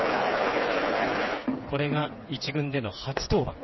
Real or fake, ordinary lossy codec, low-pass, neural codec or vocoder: fake; MP3, 24 kbps; 7.2 kHz; codec, 16 kHz, 4 kbps, FreqCodec, smaller model